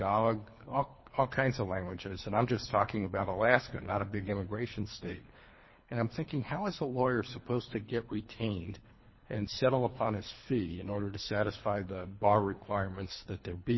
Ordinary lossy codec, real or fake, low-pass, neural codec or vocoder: MP3, 24 kbps; fake; 7.2 kHz; codec, 24 kHz, 3 kbps, HILCodec